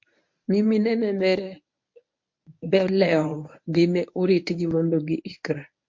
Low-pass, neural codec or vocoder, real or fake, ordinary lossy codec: 7.2 kHz; codec, 24 kHz, 0.9 kbps, WavTokenizer, medium speech release version 1; fake; MP3, 48 kbps